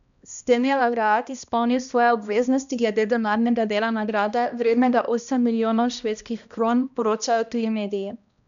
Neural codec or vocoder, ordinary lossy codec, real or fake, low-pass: codec, 16 kHz, 1 kbps, X-Codec, HuBERT features, trained on balanced general audio; none; fake; 7.2 kHz